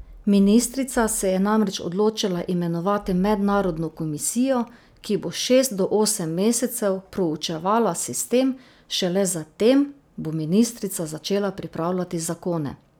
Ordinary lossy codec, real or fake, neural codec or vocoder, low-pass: none; real; none; none